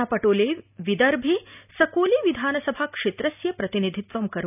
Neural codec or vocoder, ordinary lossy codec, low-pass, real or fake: none; none; 3.6 kHz; real